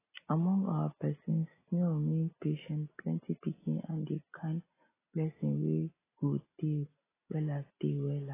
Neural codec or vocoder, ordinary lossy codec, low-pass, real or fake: none; AAC, 16 kbps; 3.6 kHz; real